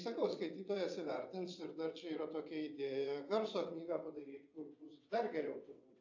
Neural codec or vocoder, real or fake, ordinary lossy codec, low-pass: vocoder, 22.05 kHz, 80 mel bands, WaveNeXt; fake; AAC, 48 kbps; 7.2 kHz